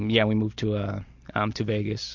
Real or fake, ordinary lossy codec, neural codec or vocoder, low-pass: real; Opus, 64 kbps; none; 7.2 kHz